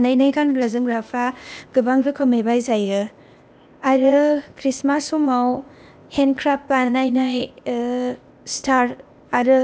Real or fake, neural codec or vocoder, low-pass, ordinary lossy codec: fake; codec, 16 kHz, 0.8 kbps, ZipCodec; none; none